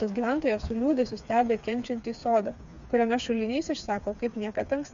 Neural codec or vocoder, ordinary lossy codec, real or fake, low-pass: codec, 16 kHz, 4 kbps, FreqCodec, smaller model; MP3, 64 kbps; fake; 7.2 kHz